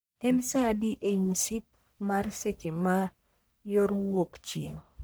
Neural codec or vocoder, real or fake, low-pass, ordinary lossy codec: codec, 44.1 kHz, 1.7 kbps, Pupu-Codec; fake; none; none